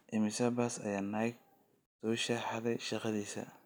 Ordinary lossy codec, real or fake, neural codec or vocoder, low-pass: none; real; none; none